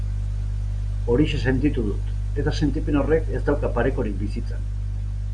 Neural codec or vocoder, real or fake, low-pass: none; real; 9.9 kHz